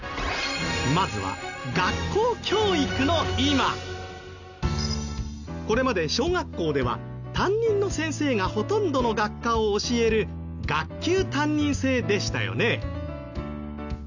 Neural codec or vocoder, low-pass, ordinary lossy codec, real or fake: none; 7.2 kHz; none; real